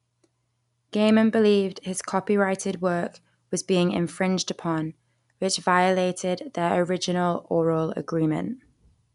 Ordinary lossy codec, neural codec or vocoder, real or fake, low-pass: none; none; real; 10.8 kHz